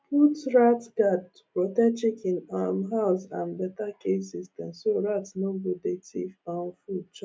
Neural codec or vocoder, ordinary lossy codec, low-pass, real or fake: none; none; none; real